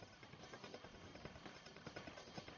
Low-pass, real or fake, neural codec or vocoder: 7.2 kHz; fake; codec, 44.1 kHz, 1.7 kbps, Pupu-Codec